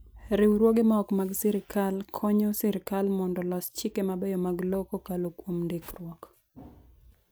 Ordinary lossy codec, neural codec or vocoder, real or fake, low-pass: none; none; real; none